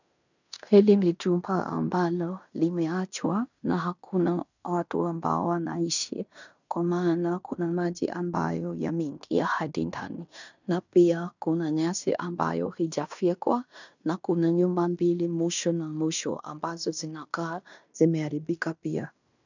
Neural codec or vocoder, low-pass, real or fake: codec, 16 kHz in and 24 kHz out, 0.9 kbps, LongCat-Audio-Codec, fine tuned four codebook decoder; 7.2 kHz; fake